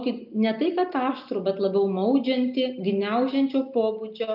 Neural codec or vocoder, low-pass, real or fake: none; 5.4 kHz; real